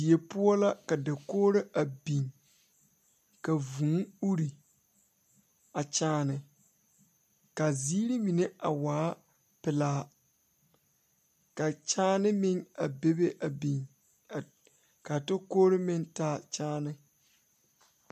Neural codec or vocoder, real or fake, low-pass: none; real; 14.4 kHz